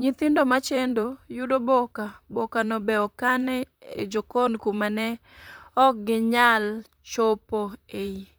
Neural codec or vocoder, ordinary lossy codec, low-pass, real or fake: vocoder, 44.1 kHz, 128 mel bands, Pupu-Vocoder; none; none; fake